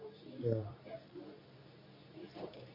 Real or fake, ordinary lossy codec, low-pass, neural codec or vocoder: fake; MP3, 24 kbps; 5.4 kHz; codec, 24 kHz, 0.9 kbps, WavTokenizer, medium speech release version 2